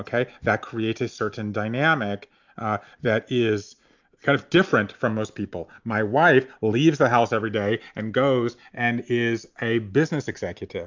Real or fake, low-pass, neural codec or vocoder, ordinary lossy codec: real; 7.2 kHz; none; MP3, 64 kbps